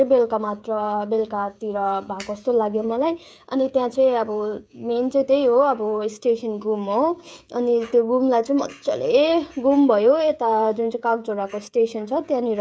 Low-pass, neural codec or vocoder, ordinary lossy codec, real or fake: none; codec, 16 kHz, 16 kbps, FreqCodec, smaller model; none; fake